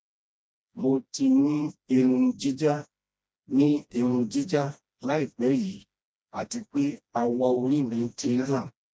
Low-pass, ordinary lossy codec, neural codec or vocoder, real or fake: none; none; codec, 16 kHz, 1 kbps, FreqCodec, smaller model; fake